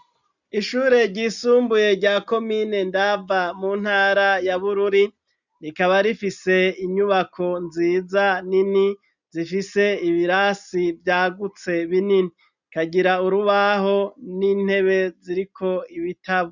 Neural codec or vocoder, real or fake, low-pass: none; real; 7.2 kHz